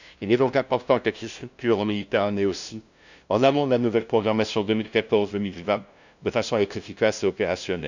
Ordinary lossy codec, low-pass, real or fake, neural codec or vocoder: none; 7.2 kHz; fake; codec, 16 kHz, 0.5 kbps, FunCodec, trained on LibriTTS, 25 frames a second